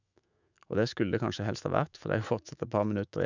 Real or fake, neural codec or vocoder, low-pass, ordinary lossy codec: fake; autoencoder, 48 kHz, 128 numbers a frame, DAC-VAE, trained on Japanese speech; 7.2 kHz; none